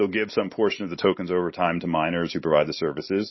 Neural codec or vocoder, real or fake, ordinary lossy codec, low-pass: none; real; MP3, 24 kbps; 7.2 kHz